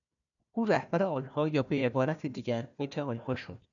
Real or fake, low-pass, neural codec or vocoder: fake; 7.2 kHz; codec, 16 kHz, 1 kbps, FunCodec, trained on Chinese and English, 50 frames a second